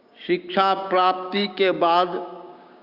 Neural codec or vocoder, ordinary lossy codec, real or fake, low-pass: codec, 16 kHz, 6 kbps, DAC; Opus, 64 kbps; fake; 5.4 kHz